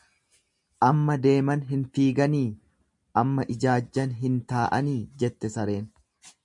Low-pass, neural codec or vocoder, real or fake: 10.8 kHz; none; real